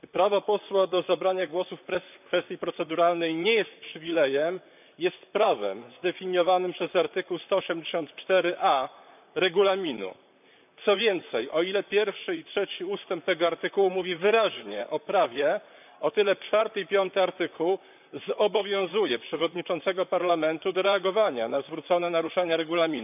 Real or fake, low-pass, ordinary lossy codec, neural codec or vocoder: fake; 3.6 kHz; none; vocoder, 44.1 kHz, 128 mel bands, Pupu-Vocoder